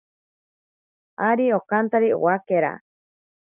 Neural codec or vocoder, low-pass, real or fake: none; 3.6 kHz; real